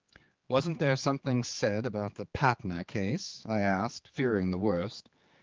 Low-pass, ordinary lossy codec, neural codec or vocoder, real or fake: 7.2 kHz; Opus, 32 kbps; codec, 16 kHz, 4 kbps, X-Codec, HuBERT features, trained on general audio; fake